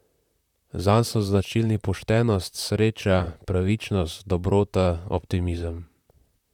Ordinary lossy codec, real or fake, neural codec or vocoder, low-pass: none; fake; vocoder, 44.1 kHz, 128 mel bands, Pupu-Vocoder; 19.8 kHz